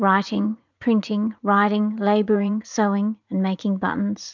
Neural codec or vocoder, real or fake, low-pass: vocoder, 44.1 kHz, 80 mel bands, Vocos; fake; 7.2 kHz